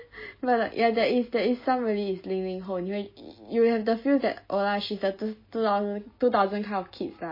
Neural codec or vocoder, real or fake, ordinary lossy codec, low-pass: none; real; MP3, 24 kbps; 5.4 kHz